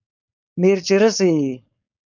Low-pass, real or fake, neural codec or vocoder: 7.2 kHz; fake; codec, 16 kHz, 4.8 kbps, FACodec